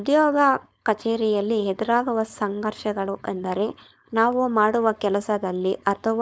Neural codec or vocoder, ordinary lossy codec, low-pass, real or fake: codec, 16 kHz, 4.8 kbps, FACodec; none; none; fake